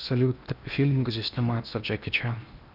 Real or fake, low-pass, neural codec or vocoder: fake; 5.4 kHz; codec, 16 kHz in and 24 kHz out, 0.8 kbps, FocalCodec, streaming, 65536 codes